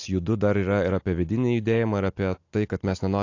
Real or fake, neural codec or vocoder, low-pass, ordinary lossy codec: real; none; 7.2 kHz; AAC, 48 kbps